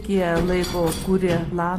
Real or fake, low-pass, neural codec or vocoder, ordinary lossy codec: real; 14.4 kHz; none; AAC, 48 kbps